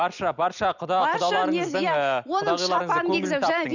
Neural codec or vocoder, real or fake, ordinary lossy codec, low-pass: none; real; none; 7.2 kHz